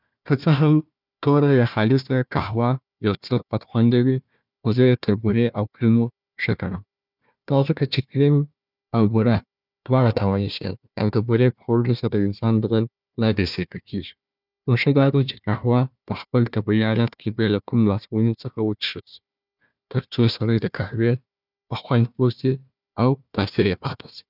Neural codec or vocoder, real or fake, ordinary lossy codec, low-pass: codec, 16 kHz, 1 kbps, FunCodec, trained on Chinese and English, 50 frames a second; fake; none; 5.4 kHz